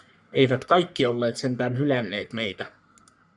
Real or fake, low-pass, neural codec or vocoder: fake; 10.8 kHz; codec, 44.1 kHz, 3.4 kbps, Pupu-Codec